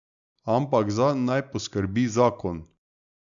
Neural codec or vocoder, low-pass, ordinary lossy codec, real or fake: none; 7.2 kHz; none; real